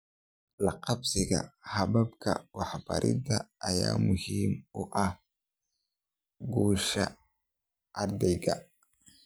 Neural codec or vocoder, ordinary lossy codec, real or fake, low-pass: none; none; real; none